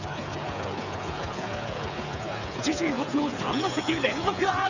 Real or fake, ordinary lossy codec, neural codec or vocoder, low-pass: fake; none; codec, 16 kHz, 8 kbps, FreqCodec, smaller model; 7.2 kHz